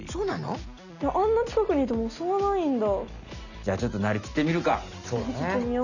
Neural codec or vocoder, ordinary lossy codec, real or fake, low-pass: none; none; real; 7.2 kHz